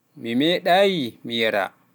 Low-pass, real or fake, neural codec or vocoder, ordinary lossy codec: none; real; none; none